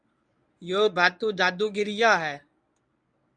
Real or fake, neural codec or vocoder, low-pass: fake; codec, 24 kHz, 0.9 kbps, WavTokenizer, medium speech release version 1; 9.9 kHz